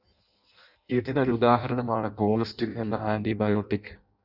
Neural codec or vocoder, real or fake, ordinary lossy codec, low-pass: codec, 16 kHz in and 24 kHz out, 0.6 kbps, FireRedTTS-2 codec; fake; Opus, 64 kbps; 5.4 kHz